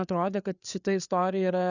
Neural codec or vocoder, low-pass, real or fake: codec, 16 kHz, 4 kbps, FreqCodec, larger model; 7.2 kHz; fake